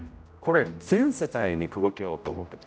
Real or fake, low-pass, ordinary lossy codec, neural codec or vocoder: fake; none; none; codec, 16 kHz, 0.5 kbps, X-Codec, HuBERT features, trained on general audio